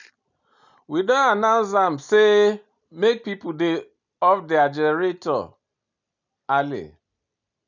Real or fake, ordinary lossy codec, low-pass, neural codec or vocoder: real; none; 7.2 kHz; none